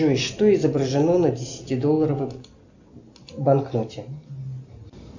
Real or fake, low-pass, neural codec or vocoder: real; 7.2 kHz; none